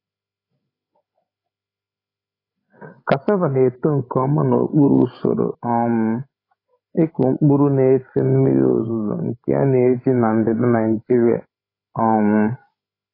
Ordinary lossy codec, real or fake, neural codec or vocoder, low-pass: AAC, 24 kbps; fake; codec, 16 kHz, 16 kbps, FreqCodec, larger model; 5.4 kHz